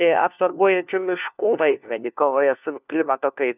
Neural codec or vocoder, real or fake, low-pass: codec, 16 kHz, 1 kbps, FunCodec, trained on LibriTTS, 50 frames a second; fake; 3.6 kHz